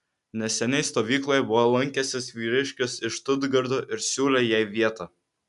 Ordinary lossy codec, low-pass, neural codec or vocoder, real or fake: MP3, 96 kbps; 10.8 kHz; none; real